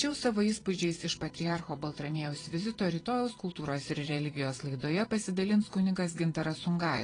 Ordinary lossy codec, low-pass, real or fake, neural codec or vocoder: AAC, 32 kbps; 9.9 kHz; fake; vocoder, 22.05 kHz, 80 mel bands, Vocos